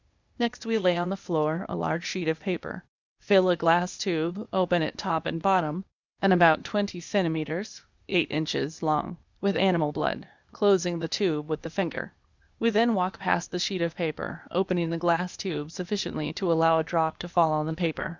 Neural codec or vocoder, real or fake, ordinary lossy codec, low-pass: codec, 16 kHz, 0.8 kbps, ZipCodec; fake; Opus, 64 kbps; 7.2 kHz